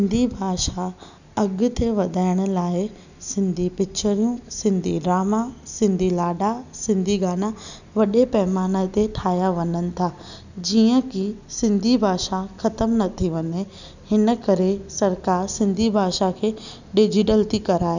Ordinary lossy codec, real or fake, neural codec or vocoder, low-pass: none; real; none; 7.2 kHz